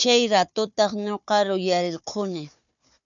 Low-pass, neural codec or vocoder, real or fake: 7.2 kHz; codec, 16 kHz, 4 kbps, FunCodec, trained on Chinese and English, 50 frames a second; fake